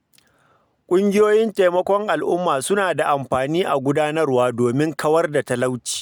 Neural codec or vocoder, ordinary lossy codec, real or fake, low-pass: none; none; real; none